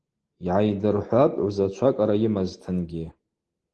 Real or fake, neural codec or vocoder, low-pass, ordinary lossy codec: real; none; 10.8 kHz; Opus, 16 kbps